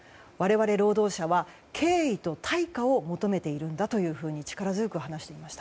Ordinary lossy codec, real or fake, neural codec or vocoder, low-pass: none; real; none; none